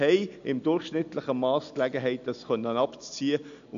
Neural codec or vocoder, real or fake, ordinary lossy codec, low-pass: none; real; AAC, 96 kbps; 7.2 kHz